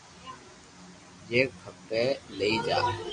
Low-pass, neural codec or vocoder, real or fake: 9.9 kHz; none; real